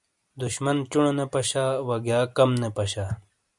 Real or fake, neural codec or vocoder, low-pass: fake; vocoder, 44.1 kHz, 128 mel bands every 256 samples, BigVGAN v2; 10.8 kHz